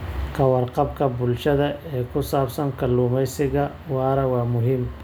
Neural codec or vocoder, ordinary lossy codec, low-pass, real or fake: none; none; none; real